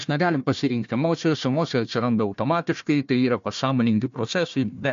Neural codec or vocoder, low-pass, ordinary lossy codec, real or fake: codec, 16 kHz, 1 kbps, FunCodec, trained on Chinese and English, 50 frames a second; 7.2 kHz; MP3, 48 kbps; fake